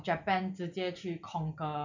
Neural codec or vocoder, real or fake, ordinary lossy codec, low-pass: none; real; none; 7.2 kHz